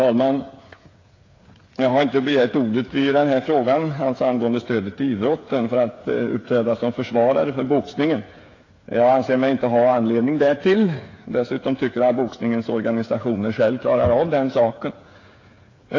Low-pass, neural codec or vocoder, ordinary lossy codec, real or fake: 7.2 kHz; codec, 16 kHz, 8 kbps, FreqCodec, smaller model; AAC, 32 kbps; fake